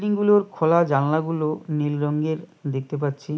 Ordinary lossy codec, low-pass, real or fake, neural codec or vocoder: none; none; real; none